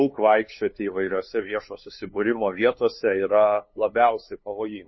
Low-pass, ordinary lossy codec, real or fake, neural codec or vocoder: 7.2 kHz; MP3, 24 kbps; fake; codec, 16 kHz, 2 kbps, FunCodec, trained on LibriTTS, 25 frames a second